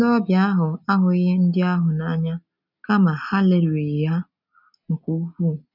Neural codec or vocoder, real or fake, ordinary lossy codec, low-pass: none; real; none; 5.4 kHz